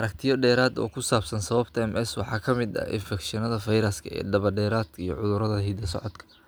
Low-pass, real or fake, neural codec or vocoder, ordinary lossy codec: none; real; none; none